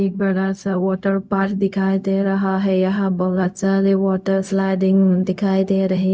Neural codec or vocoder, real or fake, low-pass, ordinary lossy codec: codec, 16 kHz, 0.4 kbps, LongCat-Audio-Codec; fake; none; none